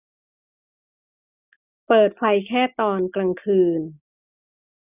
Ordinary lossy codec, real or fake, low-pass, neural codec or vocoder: none; real; 3.6 kHz; none